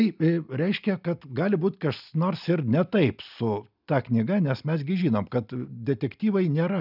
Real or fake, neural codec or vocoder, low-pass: real; none; 5.4 kHz